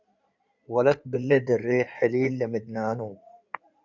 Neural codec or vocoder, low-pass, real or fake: codec, 16 kHz in and 24 kHz out, 2.2 kbps, FireRedTTS-2 codec; 7.2 kHz; fake